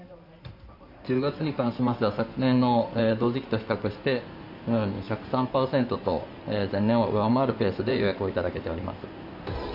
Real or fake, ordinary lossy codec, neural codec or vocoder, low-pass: fake; none; codec, 16 kHz in and 24 kHz out, 2.2 kbps, FireRedTTS-2 codec; 5.4 kHz